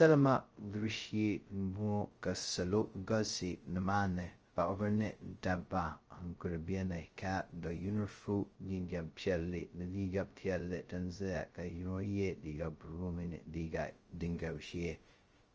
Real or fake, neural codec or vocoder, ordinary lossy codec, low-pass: fake; codec, 16 kHz, 0.2 kbps, FocalCodec; Opus, 24 kbps; 7.2 kHz